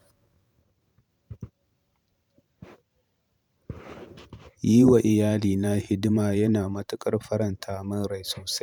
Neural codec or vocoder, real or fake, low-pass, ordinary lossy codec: vocoder, 44.1 kHz, 128 mel bands every 512 samples, BigVGAN v2; fake; 19.8 kHz; none